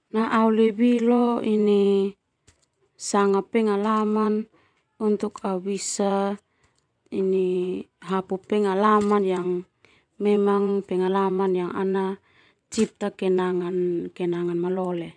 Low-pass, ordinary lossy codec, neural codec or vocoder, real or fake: 9.9 kHz; none; vocoder, 24 kHz, 100 mel bands, Vocos; fake